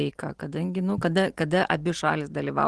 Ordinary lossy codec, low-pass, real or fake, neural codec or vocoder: Opus, 16 kbps; 10.8 kHz; real; none